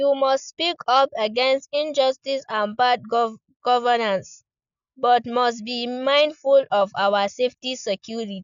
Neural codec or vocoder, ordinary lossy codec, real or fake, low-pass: none; none; real; 7.2 kHz